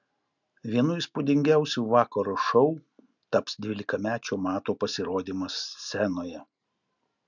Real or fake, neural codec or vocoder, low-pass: real; none; 7.2 kHz